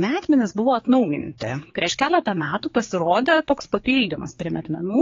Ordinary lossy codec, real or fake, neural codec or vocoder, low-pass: AAC, 32 kbps; fake; codec, 16 kHz, 4 kbps, X-Codec, HuBERT features, trained on general audio; 7.2 kHz